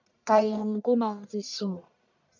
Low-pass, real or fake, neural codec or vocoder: 7.2 kHz; fake; codec, 44.1 kHz, 1.7 kbps, Pupu-Codec